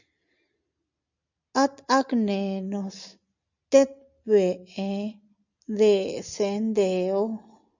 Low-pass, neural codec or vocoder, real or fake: 7.2 kHz; none; real